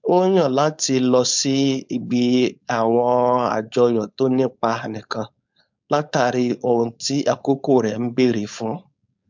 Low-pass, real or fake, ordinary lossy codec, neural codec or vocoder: 7.2 kHz; fake; MP3, 64 kbps; codec, 16 kHz, 4.8 kbps, FACodec